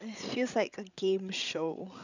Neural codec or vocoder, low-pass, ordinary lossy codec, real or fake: codec, 16 kHz, 16 kbps, FreqCodec, larger model; 7.2 kHz; none; fake